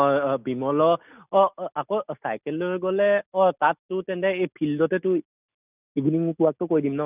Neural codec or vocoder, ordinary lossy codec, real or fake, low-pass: none; none; real; 3.6 kHz